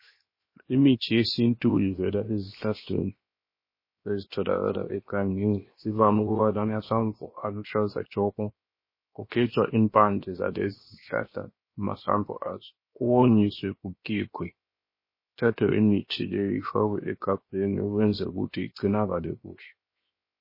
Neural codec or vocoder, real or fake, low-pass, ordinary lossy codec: codec, 16 kHz, 0.7 kbps, FocalCodec; fake; 5.4 kHz; MP3, 24 kbps